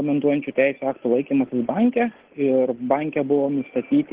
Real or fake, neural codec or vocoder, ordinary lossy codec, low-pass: real; none; Opus, 16 kbps; 3.6 kHz